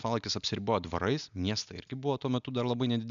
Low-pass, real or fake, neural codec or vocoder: 7.2 kHz; real; none